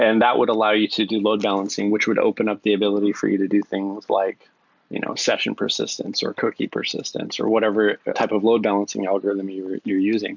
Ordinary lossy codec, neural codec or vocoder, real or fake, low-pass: MP3, 64 kbps; none; real; 7.2 kHz